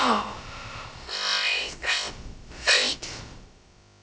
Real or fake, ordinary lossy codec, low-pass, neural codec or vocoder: fake; none; none; codec, 16 kHz, about 1 kbps, DyCAST, with the encoder's durations